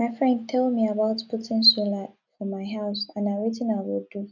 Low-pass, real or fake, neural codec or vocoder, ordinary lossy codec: 7.2 kHz; real; none; none